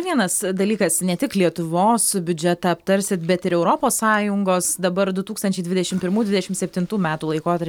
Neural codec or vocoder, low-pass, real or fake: none; 19.8 kHz; real